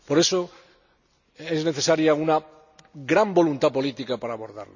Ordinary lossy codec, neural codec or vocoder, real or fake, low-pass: none; none; real; 7.2 kHz